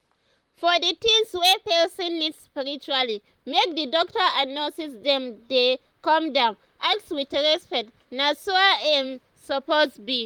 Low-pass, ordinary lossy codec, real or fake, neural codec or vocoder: 19.8 kHz; Opus, 32 kbps; fake; vocoder, 44.1 kHz, 128 mel bands, Pupu-Vocoder